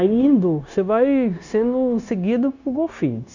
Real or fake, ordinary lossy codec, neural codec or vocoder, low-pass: fake; none; codec, 16 kHz, 0.9 kbps, LongCat-Audio-Codec; 7.2 kHz